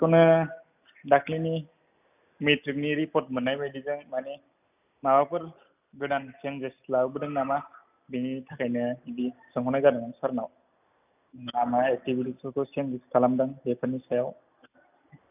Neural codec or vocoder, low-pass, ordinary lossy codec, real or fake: none; 3.6 kHz; none; real